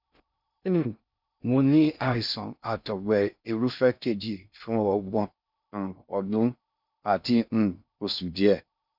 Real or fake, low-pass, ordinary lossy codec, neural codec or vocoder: fake; 5.4 kHz; none; codec, 16 kHz in and 24 kHz out, 0.6 kbps, FocalCodec, streaming, 2048 codes